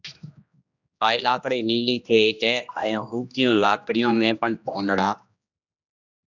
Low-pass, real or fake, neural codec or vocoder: 7.2 kHz; fake; codec, 16 kHz, 1 kbps, X-Codec, HuBERT features, trained on general audio